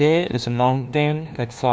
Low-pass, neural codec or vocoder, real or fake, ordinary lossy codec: none; codec, 16 kHz, 1 kbps, FunCodec, trained on LibriTTS, 50 frames a second; fake; none